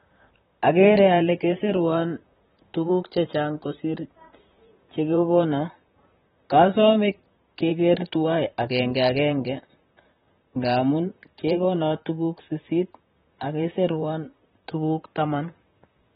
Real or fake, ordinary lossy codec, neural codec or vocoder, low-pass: fake; AAC, 16 kbps; vocoder, 44.1 kHz, 128 mel bands, Pupu-Vocoder; 19.8 kHz